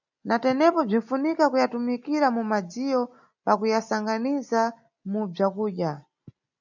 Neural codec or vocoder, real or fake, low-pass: none; real; 7.2 kHz